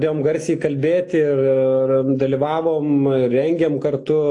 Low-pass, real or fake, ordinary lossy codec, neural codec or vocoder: 10.8 kHz; real; AAC, 48 kbps; none